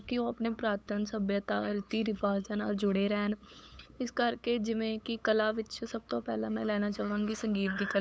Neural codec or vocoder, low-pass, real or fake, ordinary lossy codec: codec, 16 kHz, 8 kbps, FunCodec, trained on LibriTTS, 25 frames a second; none; fake; none